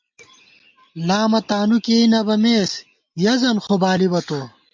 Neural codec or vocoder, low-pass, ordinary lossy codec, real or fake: none; 7.2 kHz; MP3, 48 kbps; real